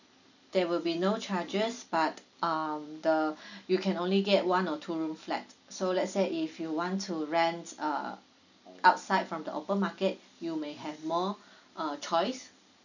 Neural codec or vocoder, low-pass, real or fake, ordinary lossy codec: none; 7.2 kHz; real; none